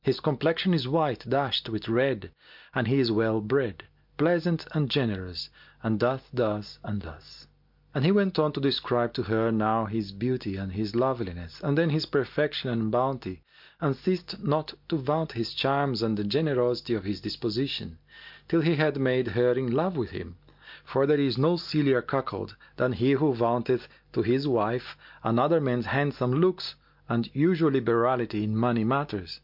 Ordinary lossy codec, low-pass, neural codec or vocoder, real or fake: MP3, 48 kbps; 5.4 kHz; none; real